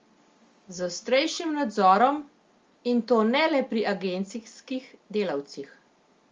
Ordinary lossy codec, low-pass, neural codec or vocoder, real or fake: Opus, 32 kbps; 7.2 kHz; none; real